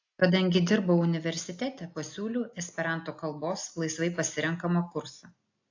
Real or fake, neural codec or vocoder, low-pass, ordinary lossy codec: real; none; 7.2 kHz; AAC, 48 kbps